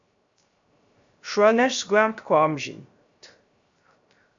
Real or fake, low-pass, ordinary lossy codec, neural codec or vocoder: fake; 7.2 kHz; AAC, 64 kbps; codec, 16 kHz, 0.3 kbps, FocalCodec